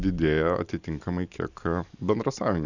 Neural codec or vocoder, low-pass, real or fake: vocoder, 44.1 kHz, 128 mel bands every 512 samples, BigVGAN v2; 7.2 kHz; fake